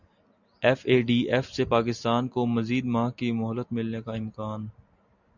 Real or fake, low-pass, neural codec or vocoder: real; 7.2 kHz; none